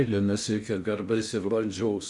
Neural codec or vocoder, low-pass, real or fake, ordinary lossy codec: codec, 16 kHz in and 24 kHz out, 0.6 kbps, FocalCodec, streaming, 4096 codes; 10.8 kHz; fake; Opus, 64 kbps